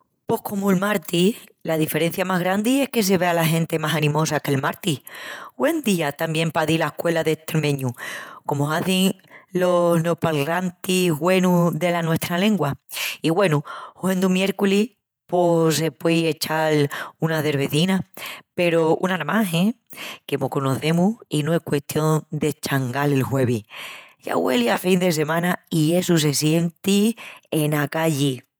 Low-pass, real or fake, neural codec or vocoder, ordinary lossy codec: none; fake; vocoder, 48 kHz, 128 mel bands, Vocos; none